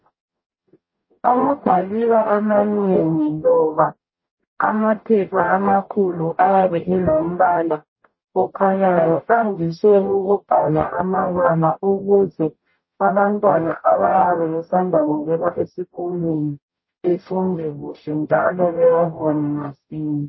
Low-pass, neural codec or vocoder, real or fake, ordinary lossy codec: 7.2 kHz; codec, 44.1 kHz, 0.9 kbps, DAC; fake; MP3, 24 kbps